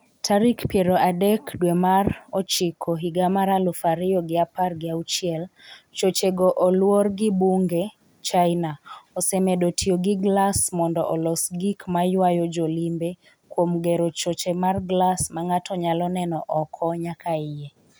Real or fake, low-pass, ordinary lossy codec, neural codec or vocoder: real; none; none; none